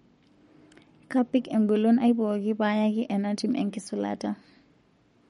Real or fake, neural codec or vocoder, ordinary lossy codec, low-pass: fake; codec, 44.1 kHz, 7.8 kbps, Pupu-Codec; MP3, 48 kbps; 19.8 kHz